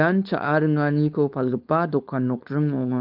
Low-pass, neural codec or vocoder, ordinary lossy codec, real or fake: 5.4 kHz; codec, 16 kHz, 4.8 kbps, FACodec; Opus, 32 kbps; fake